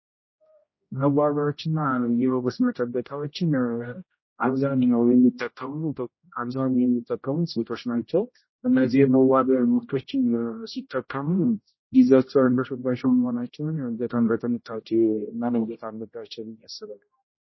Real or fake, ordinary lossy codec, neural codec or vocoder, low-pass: fake; MP3, 24 kbps; codec, 16 kHz, 0.5 kbps, X-Codec, HuBERT features, trained on general audio; 7.2 kHz